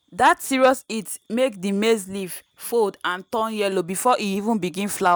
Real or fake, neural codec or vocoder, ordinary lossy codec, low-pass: real; none; none; none